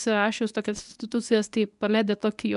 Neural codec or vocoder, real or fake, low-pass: codec, 24 kHz, 0.9 kbps, WavTokenizer, medium speech release version 1; fake; 10.8 kHz